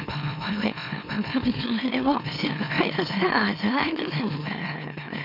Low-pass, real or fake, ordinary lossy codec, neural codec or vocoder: 5.4 kHz; fake; none; autoencoder, 44.1 kHz, a latent of 192 numbers a frame, MeloTTS